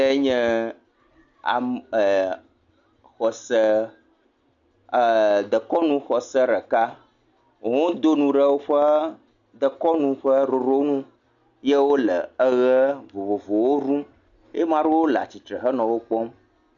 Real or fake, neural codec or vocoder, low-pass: real; none; 7.2 kHz